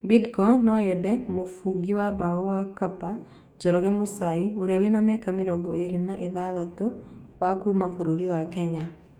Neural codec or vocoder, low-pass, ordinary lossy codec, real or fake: codec, 44.1 kHz, 2.6 kbps, DAC; 19.8 kHz; none; fake